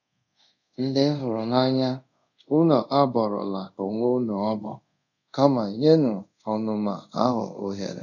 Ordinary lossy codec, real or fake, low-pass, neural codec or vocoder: none; fake; 7.2 kHz; codec, 24 kHz, 0.5 kbps, DualCodec